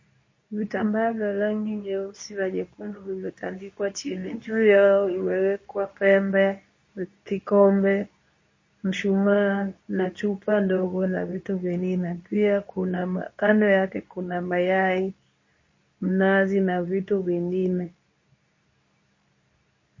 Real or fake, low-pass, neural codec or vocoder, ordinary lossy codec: fake; 7.2 kHz; codec, 24 kHz, 0.9 kbps, WavTokenizer, medium speech release version 2; MP3, 32 kbps